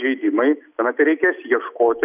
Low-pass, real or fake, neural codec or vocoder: 3.6 kHz; real; none